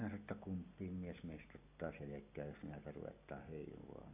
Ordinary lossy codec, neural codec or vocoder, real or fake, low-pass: AAC, 24 kbps; none; real; 3.6 kHz